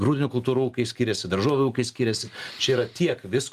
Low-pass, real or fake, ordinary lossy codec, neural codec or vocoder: 14.4 kHz; real; Opus, 32 kbps; none